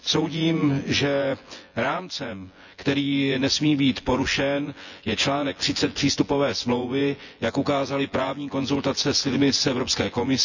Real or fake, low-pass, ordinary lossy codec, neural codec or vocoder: fake; 7.2 kHz; none; vocoder, 24 kHz, 100 mel bands, Vocos